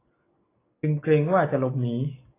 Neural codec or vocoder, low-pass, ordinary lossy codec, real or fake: none; 3.6 kHz; AAC, 16 kbps; real